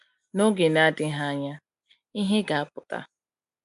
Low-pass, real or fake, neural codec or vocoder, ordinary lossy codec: 10.8 kHz; real; none; AAC, 96 kbps